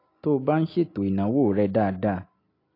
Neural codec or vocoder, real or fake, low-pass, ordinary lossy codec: none; real; 5.4 kHz; AAC, 32 kbps